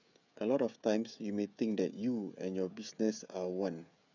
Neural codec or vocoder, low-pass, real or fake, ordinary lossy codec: codec, 16 kHz, 16 kbps, FreqCodec, smaller model; 7.2 kHz; fake; none